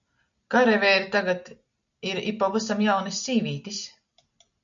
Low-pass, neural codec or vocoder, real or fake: 7.2 kHz; none; real